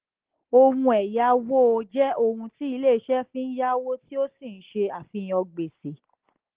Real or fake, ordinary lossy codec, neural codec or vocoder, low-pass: real; Opus, 16 kbps; none; 3.6 kHz